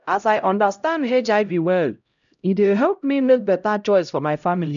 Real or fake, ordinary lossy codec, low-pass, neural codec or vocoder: fake; none; 7.2 kHz; codec, 16 kHz, 0.5 kbps, X-Codec, HuBERT features, trained on LibriSpeech